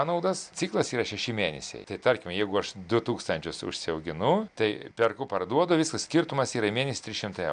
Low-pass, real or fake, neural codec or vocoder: 9.9 kHz; real; none